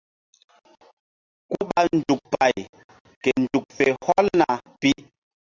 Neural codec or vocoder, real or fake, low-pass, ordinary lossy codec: none; real; 7.2 kHz; Opus, 64 kbps